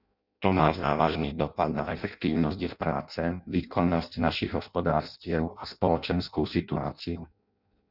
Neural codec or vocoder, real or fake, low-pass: codec, 16 kHz in and 24 kHz out, 0.6 kbps, FireRedTTS-2 codec; fake; 5.4 kHz